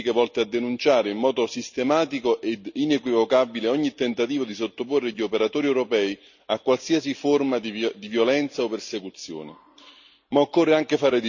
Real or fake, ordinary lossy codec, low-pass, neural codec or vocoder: real; none; 7.2 kHz; none